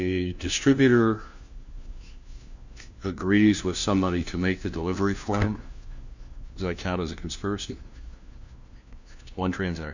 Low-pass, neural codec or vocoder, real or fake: 7.2 kHz; codec, 16 kHz, 1 kbps, FunCodec, trained on LibriTTS, 50 frames a second; fake